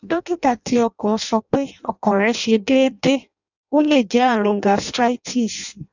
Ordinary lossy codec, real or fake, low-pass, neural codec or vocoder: none; fake; 7.2 kHz; codec, 16 kHz in and 24 kHz out, 0.6 kbps, FireRedTTS-2 codec